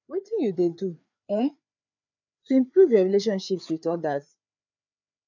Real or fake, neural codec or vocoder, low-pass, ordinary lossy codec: fake; codec, 16 kHz, 4 kbps, FreqCodec, larger model; 7.2 kHz; none